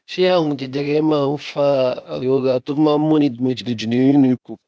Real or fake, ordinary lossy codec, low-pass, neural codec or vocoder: fake; none; none; codec, 16 kHz, 0.8 kbps, ZipCodec